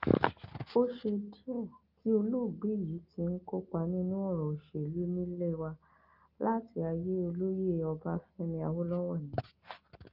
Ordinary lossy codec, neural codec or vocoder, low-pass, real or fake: Opus, 24 kbps; none; 5.4 kHz; real